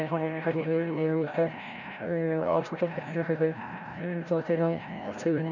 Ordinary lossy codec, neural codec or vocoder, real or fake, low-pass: none; codec, 16 kHz, 0.5 kbps, FreqCodec, larger model; fake; 7.2 kHz